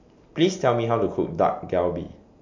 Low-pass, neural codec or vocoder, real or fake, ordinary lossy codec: 7.2 kHz; none; real; MP3, 64 kbps